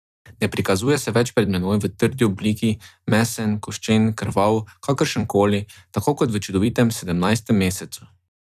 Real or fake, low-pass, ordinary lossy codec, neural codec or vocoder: fake; 14.4 kHz; none; vocoder, 44.1 kHz, 128 mel bands every 256 samples, BigVGAN v2